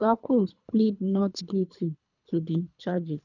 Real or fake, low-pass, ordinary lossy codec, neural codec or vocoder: fake; 7.2 kHz; none; codec, 24 kHz, 3 kbps, HILCodec